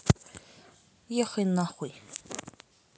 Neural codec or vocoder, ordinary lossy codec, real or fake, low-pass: none; none; real; none